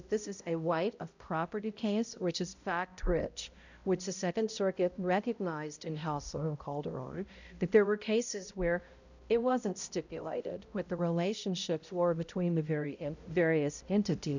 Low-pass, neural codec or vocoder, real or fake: 7.2 kHz; codec, 16 kHz, 0.5 kbps, X-Codec, HuBERT features, trained on balanced general audio; fake